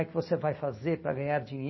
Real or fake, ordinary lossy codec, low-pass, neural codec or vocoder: real; MP3, 24 kbps; 7.2 kHz; none